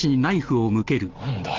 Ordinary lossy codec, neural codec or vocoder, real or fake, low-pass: Opus, 16 kbps; none; real; 7.2 kHz